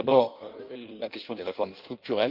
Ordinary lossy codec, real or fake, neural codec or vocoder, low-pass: Opus, 32 kbps; fake; codec, 16 kHz in and 24 kHz out, 1.1 kbps, FireRedTTS-2 codec; 5.4 kHz